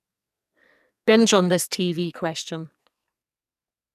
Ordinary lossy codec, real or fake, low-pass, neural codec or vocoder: none; fake; 14.4 kHz; codec, 44.1 kHz, 2.6 kbps, SNAC